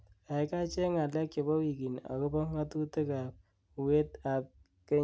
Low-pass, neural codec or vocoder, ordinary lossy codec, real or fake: none; none; none; real